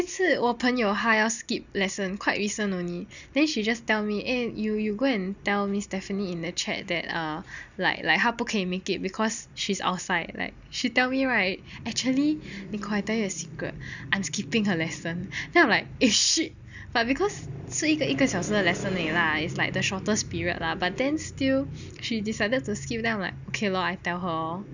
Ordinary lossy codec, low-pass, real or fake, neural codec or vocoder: none; 7.2 kHz; real; none